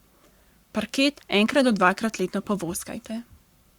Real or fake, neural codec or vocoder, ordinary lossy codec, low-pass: fake; codec, 44.1 kHz, 7.8 kbps, Pupu-Codec; Opus, 64 kbps; 19.8 kHz